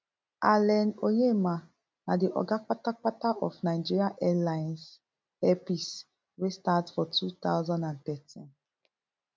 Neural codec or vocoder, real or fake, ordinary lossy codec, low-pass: none; real; none; none